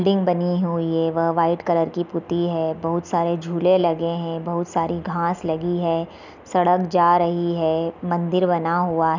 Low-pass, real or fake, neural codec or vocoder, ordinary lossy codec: 7.2 kHz; real; none; none